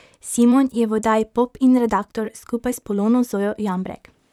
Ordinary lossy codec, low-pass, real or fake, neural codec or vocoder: none; 19.8 kHz; fake; vocoder, 44.1 kHz, 128 mel bands, Pupu-Vocoder